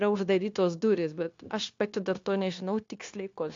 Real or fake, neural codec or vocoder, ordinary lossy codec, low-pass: fake; codec, 16 kHz, 0.9 kbps, LongCat-Audio-Codec; AAC, 48 kbps; 7.2 kHz